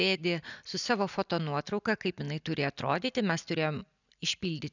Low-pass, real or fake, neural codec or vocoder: 7.2 kHz; real; none